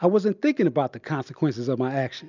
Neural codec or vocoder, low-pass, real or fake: none; 7.2 kHz; real